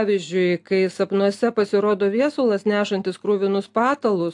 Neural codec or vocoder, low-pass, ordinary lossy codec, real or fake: none; 10.8 kHz; AAC, 64 kbps; real